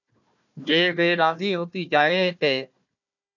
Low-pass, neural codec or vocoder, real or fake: 7.2 kHz; codec, 16 kHz, 1 kbps, FunCodec, trained on Chinese and English, 50 frames a second; fake